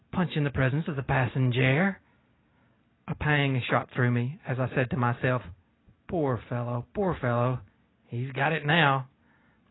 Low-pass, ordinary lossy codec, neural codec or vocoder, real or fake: 7.2 kHz; AAC, 16 kbps; none; real